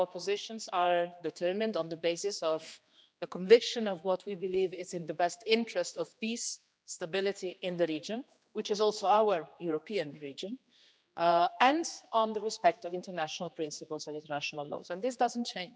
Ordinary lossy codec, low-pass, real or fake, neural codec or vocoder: none; none; fake; codec, 16 kHz, 2 kbps, X-Codec, HuBERT features, trained on general audio